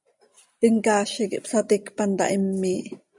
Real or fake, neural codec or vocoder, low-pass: real; none; 10.8 kHz